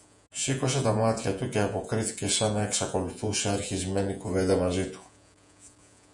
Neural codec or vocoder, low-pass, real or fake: vocoder, 48 kHz, 128 mel bands, Vocos; 10.8 kHz; fake